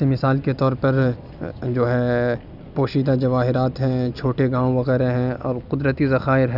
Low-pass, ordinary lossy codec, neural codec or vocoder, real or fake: 5.4 kHz; none; none; real